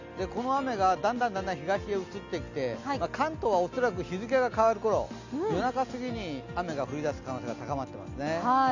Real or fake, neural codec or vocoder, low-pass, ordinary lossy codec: real; none; 7.2 kHz; none